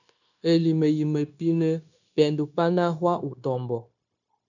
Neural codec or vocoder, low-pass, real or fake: codec, 16 kHz, 0.9 kbps, LongCat-Audio-Codec; 7.2 kHz; fake